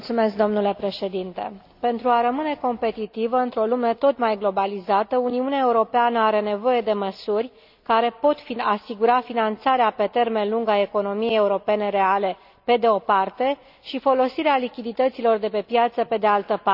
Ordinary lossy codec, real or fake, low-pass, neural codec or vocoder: none; real; 5.4 kHz; none